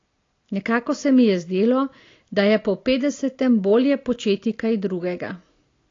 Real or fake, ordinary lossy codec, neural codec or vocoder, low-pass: real; AAC, 32 kbps; none; 7.2 kHz